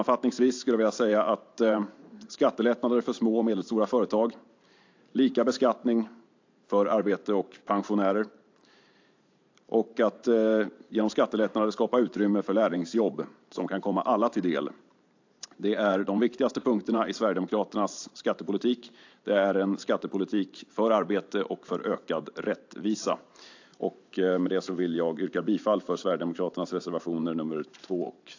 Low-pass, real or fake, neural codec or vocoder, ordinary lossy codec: 7.2 kHz; fake; vocoder, 44.1 kHz, 128 mel bands every 512 samples, BigVGAN v2; AAC, 48 kbps